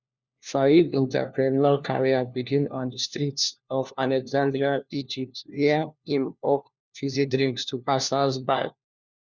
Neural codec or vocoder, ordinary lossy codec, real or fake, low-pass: codec, 16 kHz, 1 kbps, FunCodec, trained on LibriTTS, 50 frames a second; Opus, 64 kbps; fake; 7.2 kHz